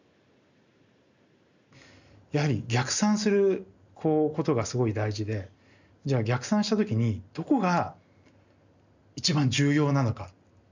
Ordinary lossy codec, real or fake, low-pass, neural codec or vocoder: none; real; 7.2 kHz; none